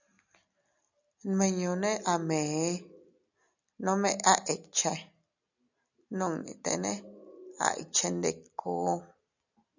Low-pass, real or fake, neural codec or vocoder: 7.2 kHz; real; none